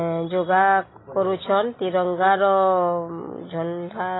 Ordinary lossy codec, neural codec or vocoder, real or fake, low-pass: AAC, 16 kbps; none; real; 7.2 kHz